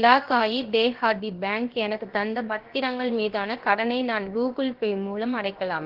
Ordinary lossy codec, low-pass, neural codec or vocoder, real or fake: Opus, 16 kbps; 5.4 kHz; codec, 16 kHz, about 1 kbps, DyCAST, with the encoder's durations; fake